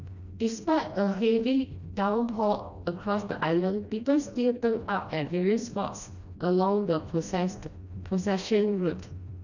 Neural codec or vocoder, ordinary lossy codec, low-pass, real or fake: codec, 16 kHz, 1 kbps, FreqCodec, smaller model; none; 7.2 kHz; fake